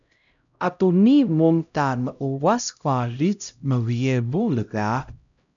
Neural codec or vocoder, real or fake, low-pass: codec, 16 kHz, 0.5 kbps, X-Codec, HuBERT features, trained on LibriSpeech; fake; 7.2 kHz